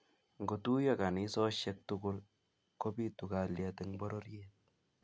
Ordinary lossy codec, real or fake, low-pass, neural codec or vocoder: none; real; none; none